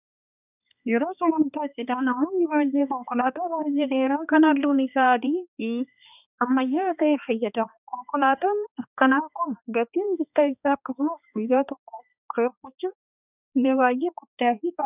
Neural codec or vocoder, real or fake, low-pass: codec, 16 kHz, 2 kbps, X-Codec, HuBERT features, trained on balanced general audio; fake; 3.6 kHz